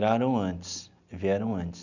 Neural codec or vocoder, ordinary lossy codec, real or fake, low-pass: none; none; real; 7.2 kHz